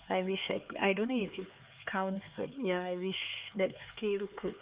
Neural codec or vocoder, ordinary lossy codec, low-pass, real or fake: codec, 16 kHz, 4 kbps, X-Codec, HuBERT features, trained on LibriSpeech; Opus, 32 kbps; 3.6 kHz; fake